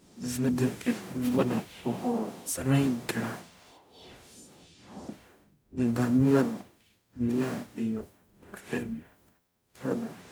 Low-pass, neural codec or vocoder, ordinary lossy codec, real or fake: none; codec, 44.1 kHz, 0.9 kbps, DAC; none; fake